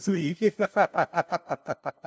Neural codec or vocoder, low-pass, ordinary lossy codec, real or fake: codec, 16 kHz, 0.5 kbps, FunCodec, trained on LibriTTS, 25 frames a second; none; none; fake